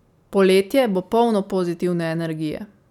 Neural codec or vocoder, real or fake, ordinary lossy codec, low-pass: none; real; none; 19.8 kHz